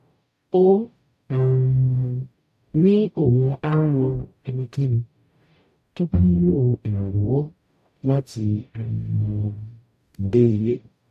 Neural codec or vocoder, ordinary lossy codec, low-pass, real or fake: codec, 44.1 kHz, 0.9 kbps, DAC; none; 14.4 kHz; fake